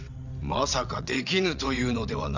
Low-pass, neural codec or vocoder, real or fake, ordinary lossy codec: 7.2 kHz; vocoder, 22.05 kHz, 80 mel bands, WaveNeXt; fake; none